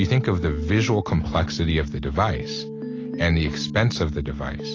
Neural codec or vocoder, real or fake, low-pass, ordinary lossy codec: none; real; 7.2 kHz; AAC, 32 kbps